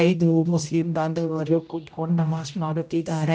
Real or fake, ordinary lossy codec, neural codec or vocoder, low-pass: fake; none; codec, 16 kHz, 0.5 kbps, X-Codec, HuBERT features, trained on general audio; none